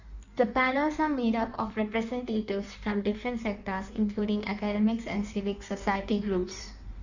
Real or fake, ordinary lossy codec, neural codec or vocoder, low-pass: fake; none; codec, 16 kHz in and 24 kHz out, 1.1 kbps, FireRedTTS-2 codec; 7.2 kHz